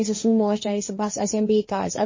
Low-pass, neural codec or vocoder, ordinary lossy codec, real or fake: 7.2 kHz; codec, 16 kHz, 1.1 kbps, Voila-Tokenizer; MP3, 32 kbps; fake